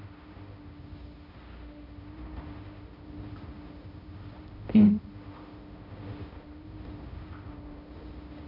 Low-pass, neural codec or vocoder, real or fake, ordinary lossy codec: 5.4 kHz; codec, 16 kHz, 0.5 kbps, X-Codec, HuBERT features, trained on balanced general audio; fake; AAC, 48 kbps